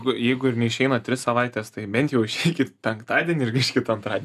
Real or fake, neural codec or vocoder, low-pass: real; none; 14.4 kHz